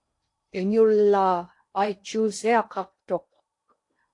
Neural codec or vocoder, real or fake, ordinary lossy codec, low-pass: codec, 16 kHz in and 24 kHz out, 0.8 kbps, FocalCodec, streaming, 65536 codes; fake; AAC, 48 kbps; 10.8 kHz